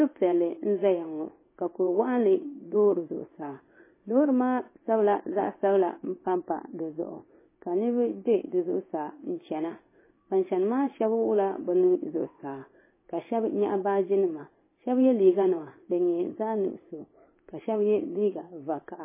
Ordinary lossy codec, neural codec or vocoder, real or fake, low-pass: MP3, 16 kbps; codec, 16 kHz in and 24 kHz out, 1 kbps, XY-Tokenizer; fake; 3.6 kHz